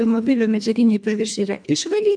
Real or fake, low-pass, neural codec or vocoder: fake; 9.9 kHz; codec, 24 kHz, 1.5 kbps, HILCodec